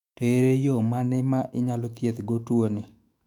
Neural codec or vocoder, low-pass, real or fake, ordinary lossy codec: autoencoder, 48 kHz, 128 numbers a frame, DAC-VAE, trained on Japanese speech; 19.8 kHz; fake; none